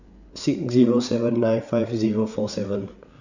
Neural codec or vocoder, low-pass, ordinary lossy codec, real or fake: codec, 16 kHz, 8 kbps, FreqCodec, larger model; 7.2 kHz; none; fake